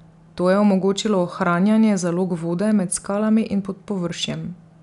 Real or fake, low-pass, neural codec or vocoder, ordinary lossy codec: real; 10.8 kHz; none; none